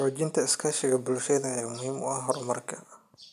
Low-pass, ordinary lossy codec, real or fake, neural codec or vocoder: 14.4 kHz; none; real; none